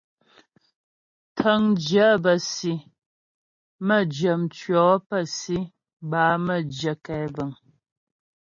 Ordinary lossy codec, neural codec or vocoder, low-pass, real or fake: MP3, 32 kbps; none; 7.2 kHz; real